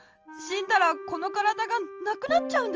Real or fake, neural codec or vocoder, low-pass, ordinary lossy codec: real; none; 7.2 kHz; Opus, 24 kbps